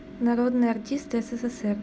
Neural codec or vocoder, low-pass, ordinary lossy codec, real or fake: none; none; none; real